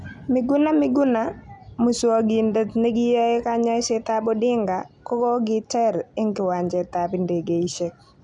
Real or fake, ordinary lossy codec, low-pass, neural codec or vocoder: real; none; 10.8 kHz; none